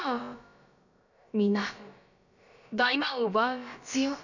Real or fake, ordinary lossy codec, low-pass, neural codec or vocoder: fake; none; 7.2 kHz; codec, 16 kHz, about 1 kbps, DyCAST, with the encoder's durations